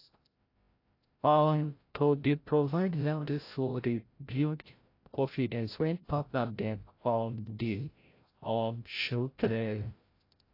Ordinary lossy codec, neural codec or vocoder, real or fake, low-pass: AAC, 32 kbps; codec, 16 kHz, 0.5 kbps, FreqCodec, larger model; fake; 5.4 kHz